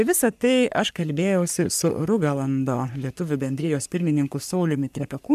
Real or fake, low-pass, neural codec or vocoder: fake; 14.4 kHz; codec, 44.1 kHz, 3.4 kbps, Pupu-Codec